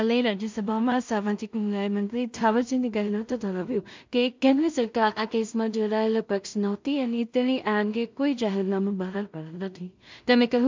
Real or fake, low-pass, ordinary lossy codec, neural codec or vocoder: fake; 7.2 kHz; MP3, 64 kbps; codec, 16 kHz in and 24 kHz out, 0.4 kbps, LongCat-Audio-Codec, two codebook decoder